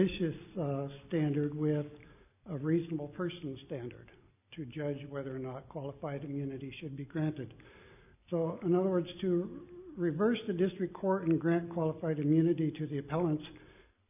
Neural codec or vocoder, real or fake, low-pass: none; real; 3.6 kHz